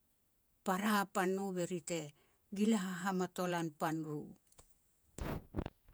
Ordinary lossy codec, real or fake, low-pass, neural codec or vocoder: none; fake; none; vocoder, 48 kHz, 128 mel bands, Vocos